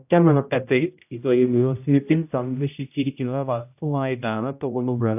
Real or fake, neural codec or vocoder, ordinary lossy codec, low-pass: fake; codec, 16 kHz, 0.5 kbps, X-Codec, HuBERT features, trained on general audio; AAC, 32 kbps; 3.6 kHz